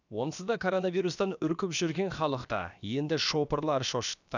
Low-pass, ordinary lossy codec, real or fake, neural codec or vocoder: 7.2 kHz; none; fake; codec, 16 kHz, about 1 kbps, DyCAST, with the encoder's durations